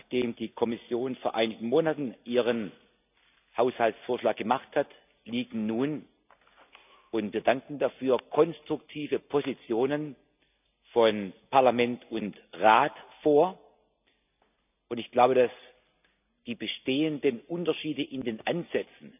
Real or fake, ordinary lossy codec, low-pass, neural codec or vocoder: real; none; 3.6 kHz; none